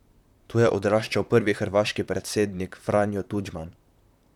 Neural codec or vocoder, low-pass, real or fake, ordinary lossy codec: vocoder, 44.1 kHz, 128 mel bands, Pupu-Vocoder; 19.8 kHz; fake; none